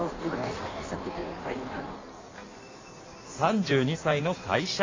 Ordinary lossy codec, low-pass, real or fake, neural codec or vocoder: AAC, 32 kbps; 7.2 kHz; fake; codec, 16 kHz in and 24 kHz out, 1.1 kbps, FireRedTTS-2 codec